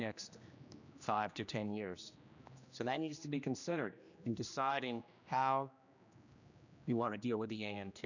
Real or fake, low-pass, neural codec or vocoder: fake; 7.2 kHz; codec, 16 kHz, 1 kbps, X-Codec, HuBERT features, trained on general audio